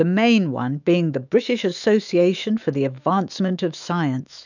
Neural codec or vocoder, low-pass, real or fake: autoencoder, 48 kHz, 128 numbers a frame, DAC-VAE, trained on Japanese speech; 7.2 kHz; fake